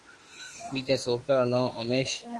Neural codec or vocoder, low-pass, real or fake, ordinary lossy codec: autoencoder, 48 kHz, 32 numbers a frame, DAC-VAE, trained on Japanese speech; 10.8 kHz; fake; Opus, 24 kbps